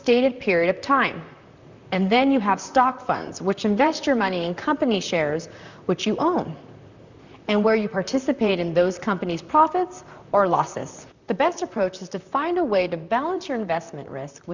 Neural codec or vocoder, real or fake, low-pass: vocoder, 44.1 kHz, 128 mel bands, Pupu-Vocoder; fake; 7.2 kHz